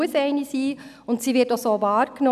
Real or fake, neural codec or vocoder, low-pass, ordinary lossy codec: real; none; 14.4 kHz; none